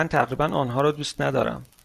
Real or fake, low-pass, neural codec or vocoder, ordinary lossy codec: real; 14.4 kHz; none; Opus, 64 kbps